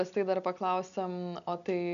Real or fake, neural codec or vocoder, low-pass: real; none; 7.2 kHz